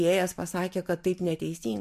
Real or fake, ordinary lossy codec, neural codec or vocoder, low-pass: real; MP3, 64 kbps; none; 14.4 kHz